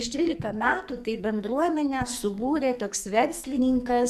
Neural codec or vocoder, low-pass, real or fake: codec, 44.1 kHz, 2.6 kbps, SNAC; 14.4 kHz; fake